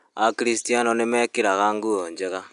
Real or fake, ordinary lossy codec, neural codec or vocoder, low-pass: real; AAC, 96 kbps; none; 10.8 kHz